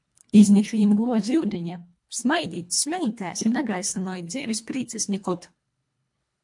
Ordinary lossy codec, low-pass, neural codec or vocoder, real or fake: MP3, 64 kbps; 10.8 kHz; codec, 24 kHz, 1.5 kbps, HILCodec; fake